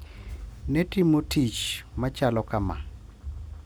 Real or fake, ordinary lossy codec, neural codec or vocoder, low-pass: real; none; none; none